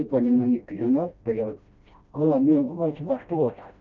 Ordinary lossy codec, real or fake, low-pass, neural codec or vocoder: none; fake; 7.2 kHz; codec, 16 kHz, 1 kbps, FreqCodec, smaller model